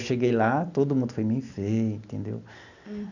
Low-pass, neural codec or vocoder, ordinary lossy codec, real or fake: 7.2 kHz; none; none; real